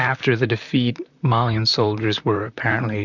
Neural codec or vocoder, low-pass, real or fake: vocoder, 44.1 kHz, 128 mel bands, Pupu-Vocoder; 7.2 kHz; fake